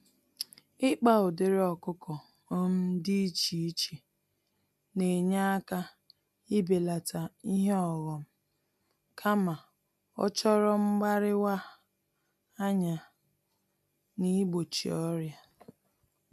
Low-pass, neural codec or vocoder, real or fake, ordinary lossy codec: 14.4 kHz; none; real; MP3, 96 kbps